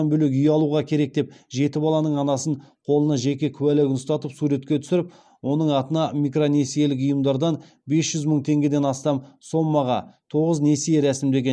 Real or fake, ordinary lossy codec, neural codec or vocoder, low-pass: real; none; none; none